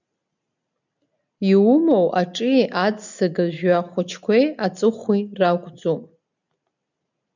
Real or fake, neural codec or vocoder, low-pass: real; none; 7.2 kHz